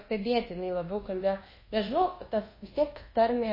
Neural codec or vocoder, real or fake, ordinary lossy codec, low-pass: codec, 24 kHz, 1.2 kbps, DualCodec; fake; MP3, 24 kbps; 5.4 kHz